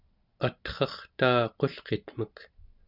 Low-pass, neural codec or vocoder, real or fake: 5.4 kHz; none; real